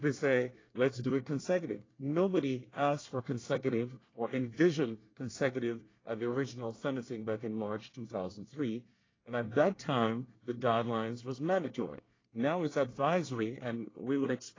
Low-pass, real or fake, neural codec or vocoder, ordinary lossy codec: 7.2 kHz; fake; codec, 24 kHz, 1 kbps, SNAC; AAC, 32 kbps